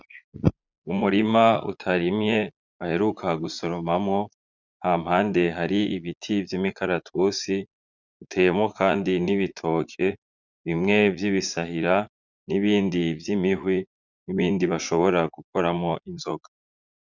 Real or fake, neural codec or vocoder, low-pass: fake; vocoder, 44.1 kHz, 80 mel bands, Vocos; 7.2 kHz